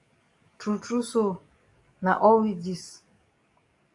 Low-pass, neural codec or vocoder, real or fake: 10.8 kHz; codec, 44.1 kHz, 7.8 kbps, DAC; fake